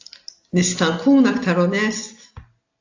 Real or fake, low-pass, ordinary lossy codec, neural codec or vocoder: real; 7.2 kHz; AAC, 48 kbps; none